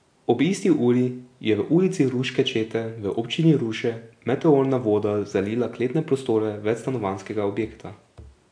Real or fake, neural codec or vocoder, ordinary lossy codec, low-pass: real; none; AAC, 64 kbps; 9.9 kHz